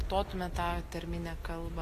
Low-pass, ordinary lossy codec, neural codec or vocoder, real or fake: 14.4 kHz; AAC, 48 kbps; none; real